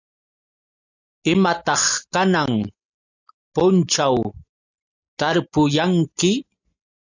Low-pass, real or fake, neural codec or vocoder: 7.2 kHz; real; none